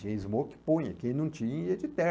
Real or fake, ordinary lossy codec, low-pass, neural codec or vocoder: real; none; none; none